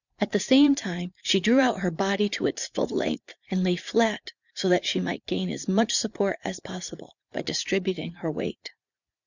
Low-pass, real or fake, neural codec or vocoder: 7.2 kHz; fake; vocoder, 44.1 kHz, 80 mel bands, Vocos